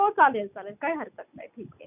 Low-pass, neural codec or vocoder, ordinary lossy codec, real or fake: 3.6 kHz; none; none; real